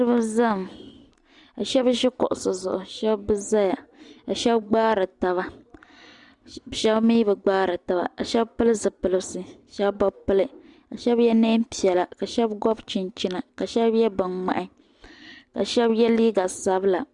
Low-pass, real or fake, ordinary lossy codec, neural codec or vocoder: 10.8 kHz; real; Opus, 64 kbps; none